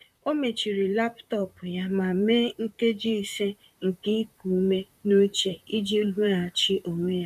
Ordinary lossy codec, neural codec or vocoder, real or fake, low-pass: none; vocoder, 44.1 kHz, 128 mel bands, Pupu-Vocoder; fake; 14.4 kHz